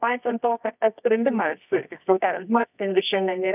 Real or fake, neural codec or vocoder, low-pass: fake; codec, 24 kHz, 0.9 kbps, WavTokenizer, medium music audio release; 3.6 kHz